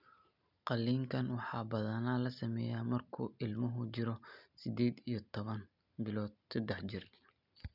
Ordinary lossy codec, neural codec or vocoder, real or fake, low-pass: none; none; real; 5.4 kHz